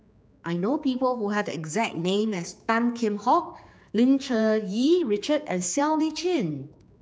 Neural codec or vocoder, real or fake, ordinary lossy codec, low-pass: codec, 16 kHz, 4 kbps, X-Codec, HuBERT features, trained on general audio; fake; none; none